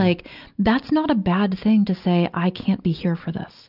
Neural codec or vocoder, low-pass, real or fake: none; 5.4 kHz; real